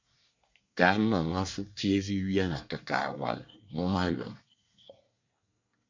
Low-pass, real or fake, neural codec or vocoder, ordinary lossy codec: 7.2 kHz; fake; codec, 24 kHz, 1 kbps, SNAC; MP3, 64 kbps